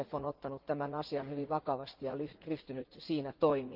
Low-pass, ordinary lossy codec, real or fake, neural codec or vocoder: 5.4 kHz; Opus, 16 kbps; fake; vocoder, 44.1 kHz, 80 mel bands, Vocos